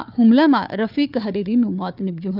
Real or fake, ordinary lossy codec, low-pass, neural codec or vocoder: fake; none; 5.4 kHz; codec, 16 kHz, 4 kbps, FunCodec, trained on Chinese and English, 50 frames a second